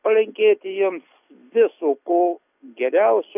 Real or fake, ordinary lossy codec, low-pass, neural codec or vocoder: real; AAC, 24 kbps; 3.6 kHz; none